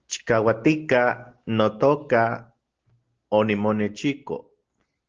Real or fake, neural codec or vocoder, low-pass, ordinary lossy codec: real; none; 7.2 kHz; Opus, 16 kbps